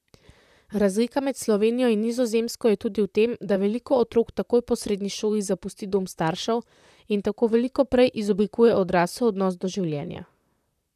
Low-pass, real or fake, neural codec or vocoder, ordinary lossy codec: 14.4 kHz; fake; vocoder, 44.1 kHz, 128 mel bands, Pupu-Vocoder; none